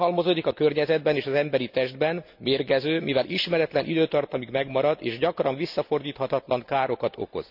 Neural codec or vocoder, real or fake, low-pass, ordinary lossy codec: none; real; 5.4 kHz; none